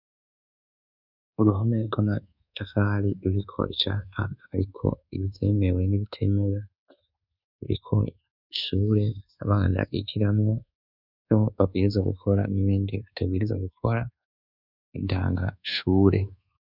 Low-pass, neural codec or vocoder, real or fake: 5.4 kHz; codec, 24 kHz, 1.2 kbps, DualCodec; fake